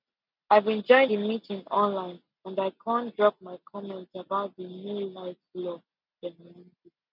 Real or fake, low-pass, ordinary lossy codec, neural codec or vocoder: real; 5.4 kHz; none; none